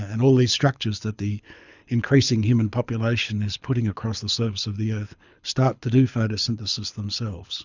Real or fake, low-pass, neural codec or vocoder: fake; 7.2 kHz; codec, 24 kHz, 6 kbps, HILCodec